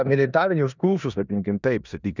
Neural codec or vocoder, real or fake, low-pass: codec, 16 kHz in and 24 kHz out, 0.9 kbps, LongCat-Audio-Codec, four codebook decoder; fake; 7.2 kHz